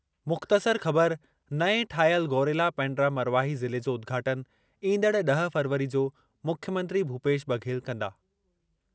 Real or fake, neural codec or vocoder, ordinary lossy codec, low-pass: real; none; none; none